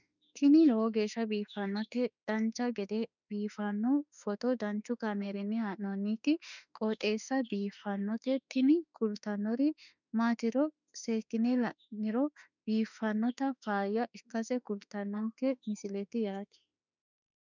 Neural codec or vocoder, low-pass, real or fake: autoencoder, 48 kHz, 32 numbers a frame, DAC-VAE, trained on Japanese speech; 7.2 kHz; fake